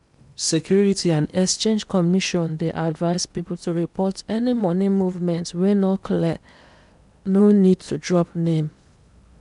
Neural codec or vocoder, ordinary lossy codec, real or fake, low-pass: codec, 16 kHz in and 24 kHz out, 0.8 kbps, FocalCodec, streaming, 65536 codes; none; fake; 10.8 kHz